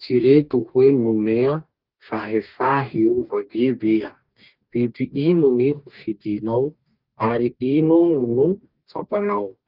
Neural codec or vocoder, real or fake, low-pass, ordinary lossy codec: codec, 44.1 kHz, 2.6 kbps, DAC; fake; 5.4 kHz; Opus, 24 kbps